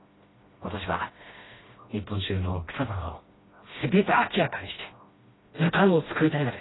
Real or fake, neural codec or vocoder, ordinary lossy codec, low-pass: fake; codec, 16 kHz, 1 kbps, FreqCodec, smaller model; AAC, 16 kbps; 7.2 kHz